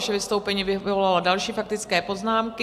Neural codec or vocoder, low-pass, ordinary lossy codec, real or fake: none; 14.4 kHz; AAC, 96 kbps; real